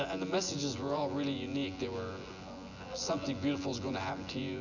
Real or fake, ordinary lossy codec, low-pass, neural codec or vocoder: fake; MP3, 64 kbps; 7.2 kHz; vocoder, 24 kHz, 100 mel bands, Vocos